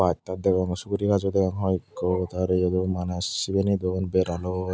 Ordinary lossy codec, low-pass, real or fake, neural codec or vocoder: none; none; real; none